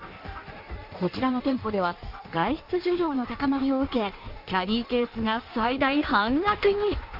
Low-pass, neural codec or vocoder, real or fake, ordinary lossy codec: 5.4 kHz; codec, 16 kHz in and 24 kHz out, 1.1 kbps, FireRedTTS-2 codec; fake; none